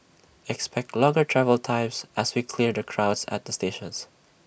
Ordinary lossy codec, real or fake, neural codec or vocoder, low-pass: none; real; none; none